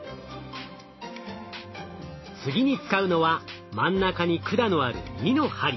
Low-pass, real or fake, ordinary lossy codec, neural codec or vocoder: 7.2 kHz; real; MP3, 24 kbps; none